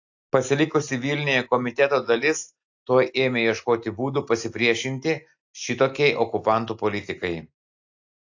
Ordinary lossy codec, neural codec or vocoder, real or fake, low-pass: AAC, 48 kbps; none; real; 7.2 kHz